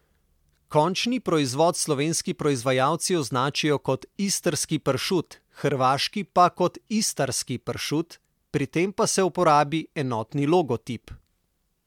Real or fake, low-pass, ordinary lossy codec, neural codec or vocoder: real; 19.8 kHz; MP3, 96 kbps; none